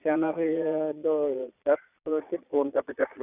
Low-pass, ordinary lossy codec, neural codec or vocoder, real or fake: 3.6 kHz; none; vocoder, 22.05 kHz, 80 mel bands, Vocos; fake